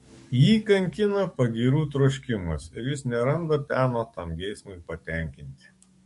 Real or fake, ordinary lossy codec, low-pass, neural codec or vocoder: fake; MP3, 48 kbps; 14.4 kHz; codec, 44.1 kHz, 7.8 kbps, DAC